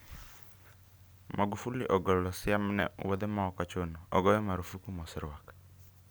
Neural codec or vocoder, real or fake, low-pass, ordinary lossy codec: none; real; none; none